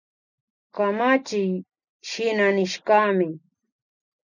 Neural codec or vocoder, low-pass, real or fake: none; 7.2 kHz; real